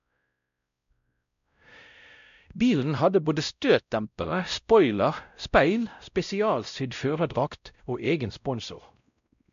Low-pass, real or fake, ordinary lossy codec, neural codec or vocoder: 7.2 kHz; fake; AAC, 96 kbps; codec, 16 kHz, 0.5 kbps, X-Codec, WavLM features, trained on Multilingual LibriSpeech